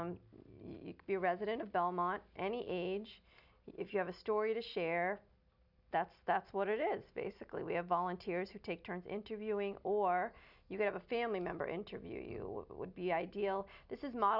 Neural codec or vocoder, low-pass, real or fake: none; 5.4 kHz; real